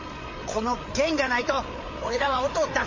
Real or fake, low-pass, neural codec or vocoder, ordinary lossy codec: fake; 7.2 kHz; codec, 16 kHz, 8 kbps, FreqCodec, larger model; MP3, 32 kbps